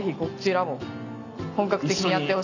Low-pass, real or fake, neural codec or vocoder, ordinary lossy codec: 7.2 kHz; real; none; none